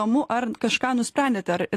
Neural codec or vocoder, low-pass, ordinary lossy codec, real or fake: none; 14.4 kHz; AAC, 48 kbps; real